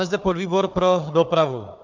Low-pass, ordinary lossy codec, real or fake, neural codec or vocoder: 7.2 kHz; MP3, 64 kbps; fake; codec, 16 kHz, 4 kbps, FunCodec, trained on Chinese and English, 50 frames a second